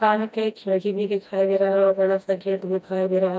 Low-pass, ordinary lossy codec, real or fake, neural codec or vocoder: none; none; fake; codec, 16 kHz, 1 kbps, FreqCodec, smaller model